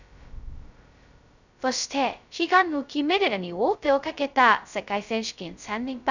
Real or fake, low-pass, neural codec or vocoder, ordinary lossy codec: fake; 7.2 kHz; codec, 16 kHz, 0.2 kbps, FocalCodec; none